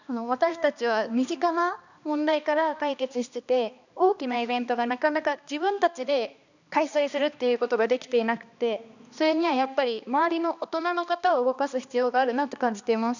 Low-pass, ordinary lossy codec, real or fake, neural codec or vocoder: 7.2 kHz; none; fake; codec, 16 kHz, 2 kbps, X-Codec, HuBERT features, trained on balanced general audio